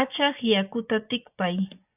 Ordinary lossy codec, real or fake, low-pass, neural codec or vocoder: AAC, 32 kbps; fake; 3.6 kHz; vocoder, 24 kHz, 100 mel bands, Vocos